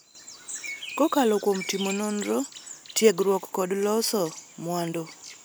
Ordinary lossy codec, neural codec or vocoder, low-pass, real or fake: none; none; none; real